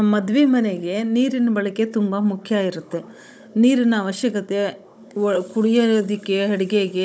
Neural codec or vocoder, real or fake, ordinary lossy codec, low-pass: codec, 16 kHz, 16 kbps, FunCodec, trained on Chinese and English, 50 frames a second; fake; none; none